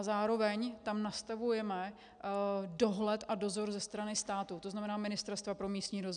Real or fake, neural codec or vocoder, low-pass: real; none; 9.9 kHz